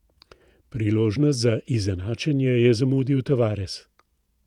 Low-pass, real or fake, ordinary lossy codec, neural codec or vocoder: 19.8 kHz; fake; none; vocoder, 48 kHz, 128 mel bands, Vocos